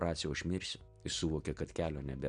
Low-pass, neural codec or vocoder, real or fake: 9.9 kHz; none; real